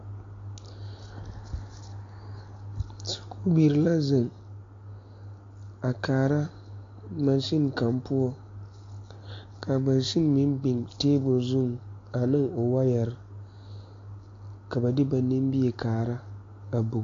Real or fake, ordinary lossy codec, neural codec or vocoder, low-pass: real; AAC, 48 kbps; none; 7.2 kHz